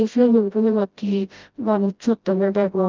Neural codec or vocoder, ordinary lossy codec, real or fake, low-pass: codec, 16 kHz, 0.5 kbps, FreqCodec, smaller model; Opus, 24 kbps; fake; 7.2 kHz